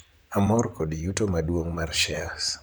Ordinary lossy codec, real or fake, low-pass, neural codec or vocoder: none; fake; none; vocoder, 44.1 kHz, 128 mel bands, Pupu-Vocoder